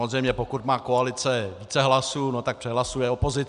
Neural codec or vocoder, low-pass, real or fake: none; 10.8 kHz; real